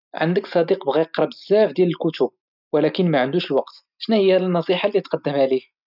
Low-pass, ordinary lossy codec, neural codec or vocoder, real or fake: 5.4 kHz; none; none; real